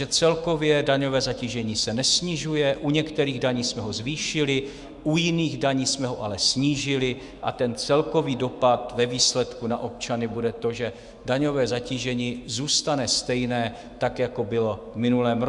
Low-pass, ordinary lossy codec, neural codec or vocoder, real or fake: 10.8 kHz; Opus, 64 kbps; autoencoder, 48 kHz, 128 numbers a frame, DAC-VAE, trained on Japanese speech; fake